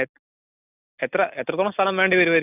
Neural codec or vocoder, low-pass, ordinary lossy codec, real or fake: none; 3.6 kHz; none; real